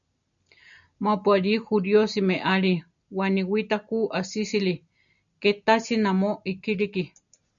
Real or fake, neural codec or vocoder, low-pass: real; none; 7.2 kHz